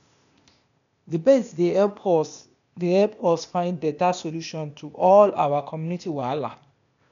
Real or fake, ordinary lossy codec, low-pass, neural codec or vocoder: fake; none; 7.2 kHz; codec, 16 kHz, 0.8 kbps, ZipCodec